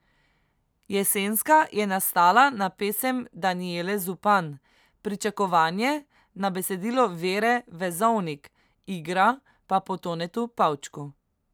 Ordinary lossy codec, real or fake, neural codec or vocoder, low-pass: none; real; none; none